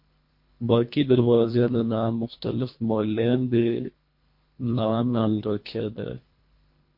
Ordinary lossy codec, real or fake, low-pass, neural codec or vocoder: MP3, 32 kbps; fake; 5.4 kHz; codec, 24 kHz, 1.5 kbps, HILCodec